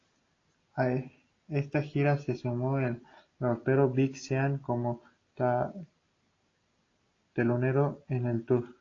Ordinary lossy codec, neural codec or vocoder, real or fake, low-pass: AAC, 32 kbps; none; real; 7.2 kHz